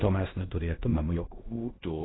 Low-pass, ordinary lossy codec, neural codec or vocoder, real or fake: 7.2 kHz; AAC, 16 kbps; codec, 16 kHz in and 24 kHz out, 0.4 kbps, LongCat-Audio-Codec, fine tuned four codebook decoder; fake